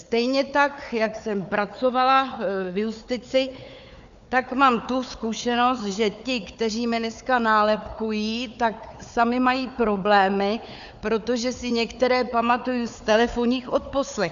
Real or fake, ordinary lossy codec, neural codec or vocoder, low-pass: fake; AAC, 96 kbps; codec, 16 kHz, 4 kbps, FunCodec, trained on Chinese and English, 50 frames a second; 7.2 kHz